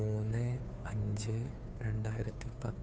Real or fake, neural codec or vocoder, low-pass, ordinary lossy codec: fake; codec, 16 kHz, 2 kbps, FunCodec, trained on Chinese and English, 25 frames a second; none; none